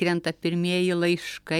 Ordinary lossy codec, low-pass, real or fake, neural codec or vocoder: MP3, 96 kbps; 19.8 kHz; real; none